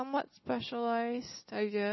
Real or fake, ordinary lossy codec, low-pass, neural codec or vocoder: real; MP3, 24 kbps; 7.2 kHz; none